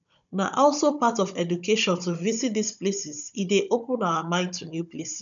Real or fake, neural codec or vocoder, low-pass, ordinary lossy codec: fake; codec, 16 kHz, 16 kbps, FunCodec, trained on Chinese and English, 50 frames a second; 7.2 kHz; none